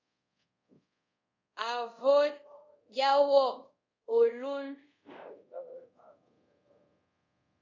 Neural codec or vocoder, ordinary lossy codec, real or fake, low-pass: codec, 24 kHz, 0.5 kbps, DualCodec; AAC, 48 kbps; fake; 7.2 kHz